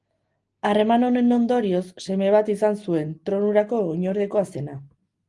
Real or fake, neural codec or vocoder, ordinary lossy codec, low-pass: real; none; Opus, 24 kbps; 10.8 kHz